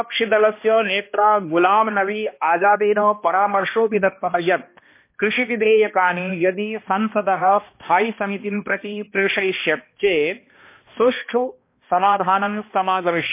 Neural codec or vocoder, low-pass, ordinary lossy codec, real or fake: codec, 16 kHz, 1 kbps, X-Codec, HuBERT features, trained on balanced general audio; 3.6 kHz; MP3, 24 kbps; fake